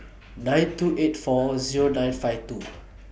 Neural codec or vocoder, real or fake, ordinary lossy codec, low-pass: none; real; none; none